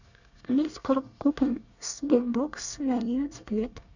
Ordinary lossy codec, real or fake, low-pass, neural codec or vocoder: none; fake; 7.2 kHz; codec, 24 kHz, 1 kbps, SNAC